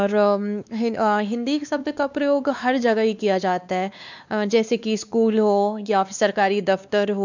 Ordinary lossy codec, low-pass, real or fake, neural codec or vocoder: none; 7.2 kHz; fake; codec, 16 kHz, 2 kbps, X-Codec, WavLM features, trained on Multilingual LibriSpeech